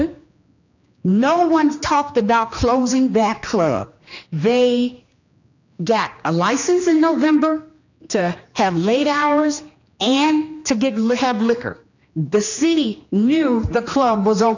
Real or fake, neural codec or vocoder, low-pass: fake; codec, 16 kHz, 2 kbps, X-Codec, HuBERT features, trained on general audio; 7.2 kHz